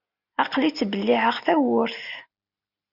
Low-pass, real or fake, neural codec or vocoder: 5.4 kHz; real; none